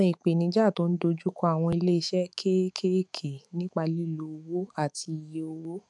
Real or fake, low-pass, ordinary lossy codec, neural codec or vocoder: fake; 10.8 kHz; none; autoencoder, 48 kHz, 128 numbers a frame, DAC-VAE, trained on Japanese speech